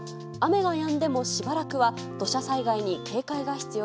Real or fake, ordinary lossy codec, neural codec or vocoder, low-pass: real; none; none; none